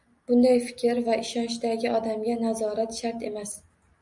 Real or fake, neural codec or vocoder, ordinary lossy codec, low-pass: real; none; MP3, 64 kbps; 10.8 kHz